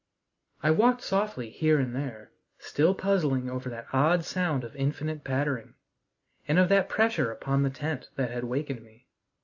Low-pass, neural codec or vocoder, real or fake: 7.2 kHz; none; real